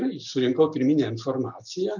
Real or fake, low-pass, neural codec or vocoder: real; 7.2 kHz; none